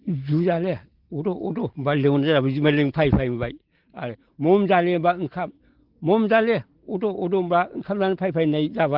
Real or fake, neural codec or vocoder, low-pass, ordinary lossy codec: real; none; 5.4 kHz; Opus, 16 kbps